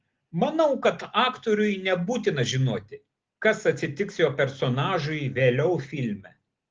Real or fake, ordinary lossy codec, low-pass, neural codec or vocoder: real; Opus, 16 kbps; 7.2 kHz; none